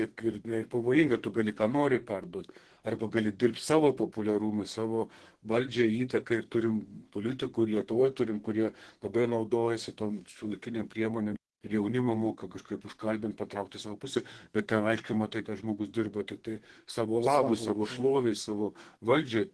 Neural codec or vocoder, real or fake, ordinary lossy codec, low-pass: codec, 32 kHz, 1.9 kbps, SNAC; fake; Opus, 16 kbps; 10.8 kHz